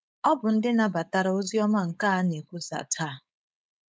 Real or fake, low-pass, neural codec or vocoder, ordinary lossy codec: fake; none; codec, 16 kHz, 4.8 kbps, FACodec; none